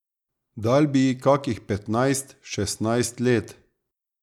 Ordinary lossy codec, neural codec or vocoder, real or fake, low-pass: none; none; real; 19.8 kHz